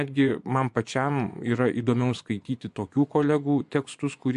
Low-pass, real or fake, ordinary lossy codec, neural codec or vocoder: 10.8 kHz; fake; MP3, 64 kbps; vocoder, 24 kHz, 100 mel bands, Vocos